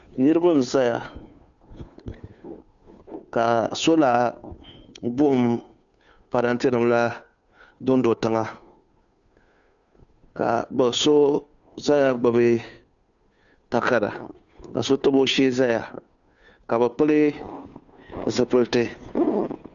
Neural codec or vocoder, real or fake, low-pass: codec, 16 kHz, 2 kbps, FunCodec, trained on Chinese and English, 25 frames a second; fake; 7.2 kHz